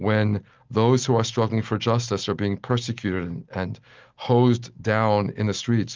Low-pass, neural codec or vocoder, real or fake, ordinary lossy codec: 7.2 kHz; none; real; Opus, 32 kbps